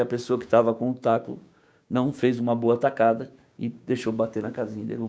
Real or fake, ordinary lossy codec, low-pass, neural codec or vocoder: fake; none; none; codec, 16 kHz, 6 kbps, DAC